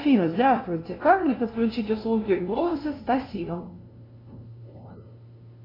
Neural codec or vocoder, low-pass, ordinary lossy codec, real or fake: codec, 16 kHz, 0.5 kbps, FunCodec, trained on LibriTTS, 25 frames a second; 5.4 kHz; AAC, 24 kbps; fake